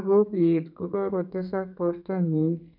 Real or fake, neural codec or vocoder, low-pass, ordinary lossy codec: fake; codec, 32 kHz, 1.9 kbps, SNAC; 5.4 kHz; none